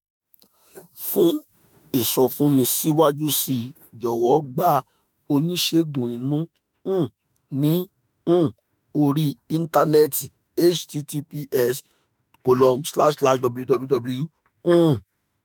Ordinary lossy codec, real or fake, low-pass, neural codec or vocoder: none; fake; none; autoencoder, 48 kHz, 32 numbers a frame, DAC-VAE, trained on Japanese speech